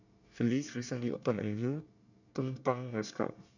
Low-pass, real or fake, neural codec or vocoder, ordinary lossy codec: 7.2 kHz; fake; codec, 24 kHz, 1 kbps, SNAC; none